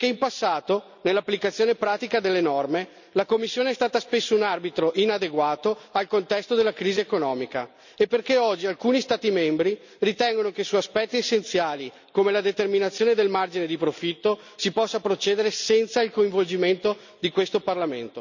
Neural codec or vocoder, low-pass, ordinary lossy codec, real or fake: none; 7.2 kHz; none; real